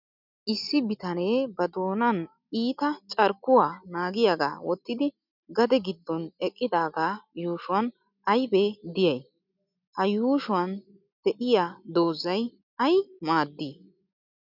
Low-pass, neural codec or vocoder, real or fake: 5.4 kHz; none; real